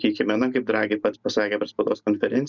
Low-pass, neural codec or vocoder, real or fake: 7.2 kHz; vocoder, 44.1 kHz, 128 mel bands every 512 samples, BigVGAN v2; fake